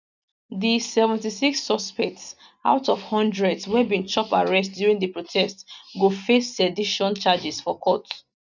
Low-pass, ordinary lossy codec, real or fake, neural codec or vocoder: 7.2 kHz; none; real; none